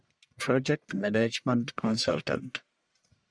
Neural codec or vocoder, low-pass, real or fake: codec, 44.1 kHz, 1.7 kbps, Pupu-Codec; 9.9 kHz; fake